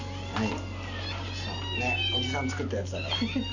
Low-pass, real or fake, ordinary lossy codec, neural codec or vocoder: 7.2 kHz; real; none; none